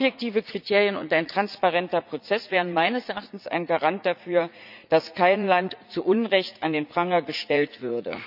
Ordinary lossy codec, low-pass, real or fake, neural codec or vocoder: none; 5.4 kHz; fake; vocoder, 44.1 kHz, 80 mel bands, Vocos